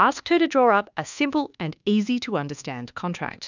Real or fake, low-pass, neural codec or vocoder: fake; 7.2 kHz; codec, 24 kHz, 1.2 kbps, DualCodec